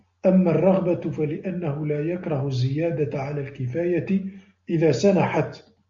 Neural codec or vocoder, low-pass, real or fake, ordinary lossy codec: none; 7.2 kHz; real; MP3, 48 kbps